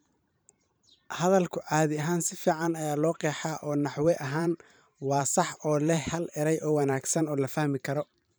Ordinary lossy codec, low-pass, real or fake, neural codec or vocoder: none; none; fake; vocoder, 44.1 kHz, 128 mel bands every 512 samples, BigVGAN v2